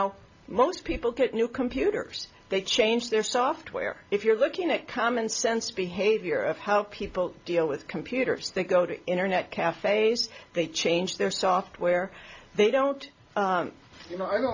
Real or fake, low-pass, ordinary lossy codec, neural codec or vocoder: real; 7.2 kHz; AAC, 48 kbps; none